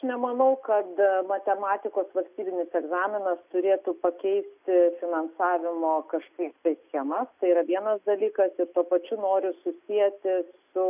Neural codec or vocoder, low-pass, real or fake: none; 3.6 kHz; real